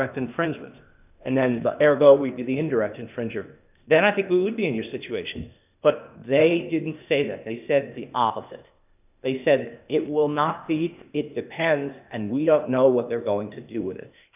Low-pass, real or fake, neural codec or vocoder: 3.6 kHz; fake; codec, 16 kHz, 0.8 kbps, ZipCodec